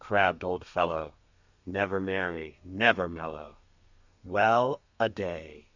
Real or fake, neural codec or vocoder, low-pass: fake; codec, 32 kHz, 1.9 kbps, SNAC; 7.2 kHz